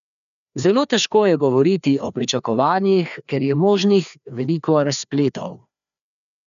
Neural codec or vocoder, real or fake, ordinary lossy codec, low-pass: codec, 16 kHz, 2 kbps, FreqCodec, larger model; fake; none; 7.2 kHz